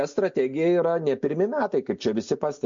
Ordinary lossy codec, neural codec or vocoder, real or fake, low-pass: MP3, 48 kbps; none; real; 7.2 kHz